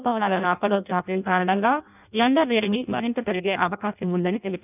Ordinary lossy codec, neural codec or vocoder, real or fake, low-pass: none; codec, 16 kHz in and 24 kHz out, 0.6 kbps, FireRedTTS-2 codec; fake; 3.6 kHz